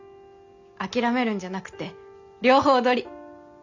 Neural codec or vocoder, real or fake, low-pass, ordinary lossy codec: none; real; 7.2 kHz; none